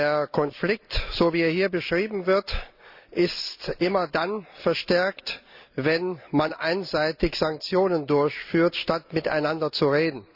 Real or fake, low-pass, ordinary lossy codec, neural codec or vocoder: real; 5.4 kHz; Opus, 64 kbps; none